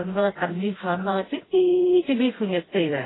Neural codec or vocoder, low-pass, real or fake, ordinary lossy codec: codec, 16 kHz, 1 kbps, FreqCodec, smaller model; 7.2 kHz; fake; AAC, 16 kbps